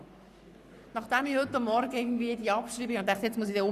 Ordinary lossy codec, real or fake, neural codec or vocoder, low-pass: Opus, 64 kbps; fake; codec, 44.1 kHz, 7.8 kbps, Pupu-Codec; 14.4 kHz